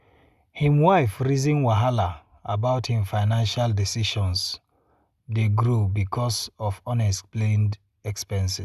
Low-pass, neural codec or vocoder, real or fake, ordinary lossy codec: 14.4 kHz; none; real; Opus, 64 kbps